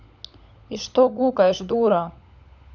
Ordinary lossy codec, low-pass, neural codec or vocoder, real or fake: none; 7.2 kHz; codec, 16 kHz, 16 kbps, FunCodec, trained on LibriTTS, 50 frames a second; fake